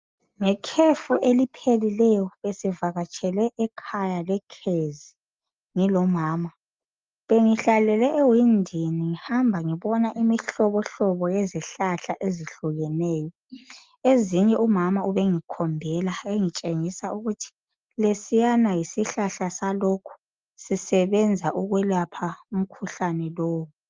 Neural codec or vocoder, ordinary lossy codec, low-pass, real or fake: none; Opus, 32 kbps; 7.2 kHz; real